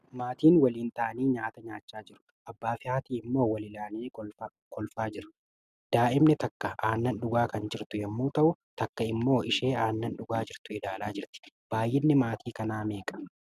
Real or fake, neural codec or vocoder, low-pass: real; none; 14.4 kHz